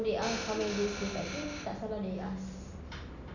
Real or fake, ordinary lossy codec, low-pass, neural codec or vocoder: real; none; 7.2 kHz; none